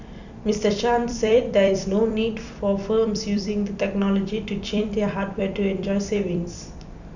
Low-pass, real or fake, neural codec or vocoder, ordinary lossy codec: 7.2 kHz; fake; vocoder, 44.1 kHz, 128 mel bands every 256 samples, BigVGAN v2; none